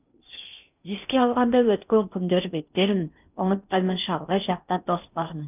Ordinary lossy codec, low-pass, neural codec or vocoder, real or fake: none; 3.6 kHz; codec, 16 kHz in and 24 kHz out, 0.6 kbps, FocalCodec, streaming, 2048 codes; fake